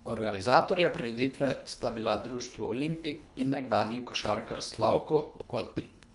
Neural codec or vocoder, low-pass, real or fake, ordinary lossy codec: codec, 24 kHz, 1.5 kbps, HILCodec; 10.8 kHz; fake; none